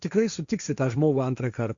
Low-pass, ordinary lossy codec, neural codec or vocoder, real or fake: 7.2 kHz; Opus, 64 kbps; codec, 16 kHz, 1.1 kbps, Voila-Tokenizer; fake